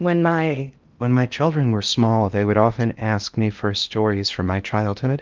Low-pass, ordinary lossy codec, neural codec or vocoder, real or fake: 7.2 kHz; Opus, 32 kbps; codec, 16 kHz in and 24 kHz out, 0.6 kbps, FocalCodec, streaming, 2048 codes; fake